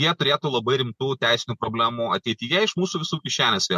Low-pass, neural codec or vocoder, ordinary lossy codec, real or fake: 14.4 kHz; none; MP3, 64 kbps; real